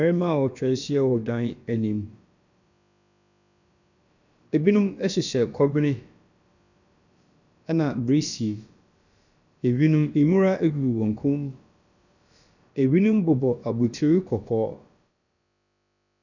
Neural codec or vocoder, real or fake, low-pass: codec, 16 kHz, about 1 kbps, DyCAST, with the encoder's durations; fake; 7.2 kHz